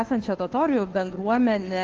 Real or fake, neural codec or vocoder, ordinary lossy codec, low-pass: fake; codec, 16 kHz, 2 kbps, FunCodec, trained on Chinese and English, 25 frames a second; Opus, 16 kbps; 7.2 kHz